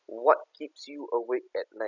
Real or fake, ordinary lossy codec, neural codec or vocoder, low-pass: real; none; none; 7.2 kHz